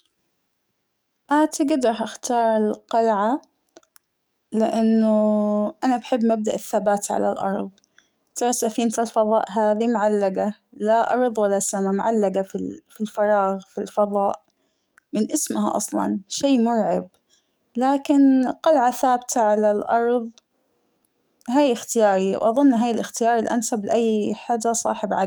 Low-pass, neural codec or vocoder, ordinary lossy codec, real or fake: none; codec, 44.1 kHz, 7.8 kbps, DAC; none; fake